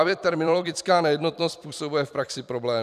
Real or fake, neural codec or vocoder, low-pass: fake; vocoder, 44.1 kHz, 128 mel bands every 256 samples, BigVGAN v2; 14.4 kHz